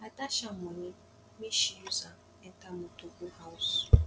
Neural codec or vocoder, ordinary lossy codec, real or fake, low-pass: none; none; real; none